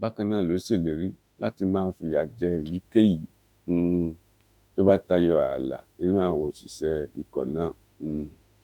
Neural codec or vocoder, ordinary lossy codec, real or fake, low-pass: autoencoder, 48 kHz, 32 numbers a frame, DAC-VAE, trained on Japanese speech; none; fake; 19.8 kHz